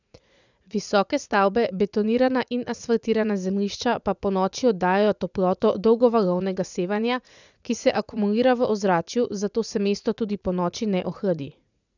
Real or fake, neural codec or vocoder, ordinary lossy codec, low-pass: fake; vocoder, 44.1 kHz, 80 mel bands, Vocos; none; 7.2 kHz